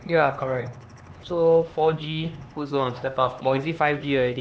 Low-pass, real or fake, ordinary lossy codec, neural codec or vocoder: none; fake; none; codec, 16 kHz, 2 kbps, X-Codec, HuBERT features, trained on LibriSpeech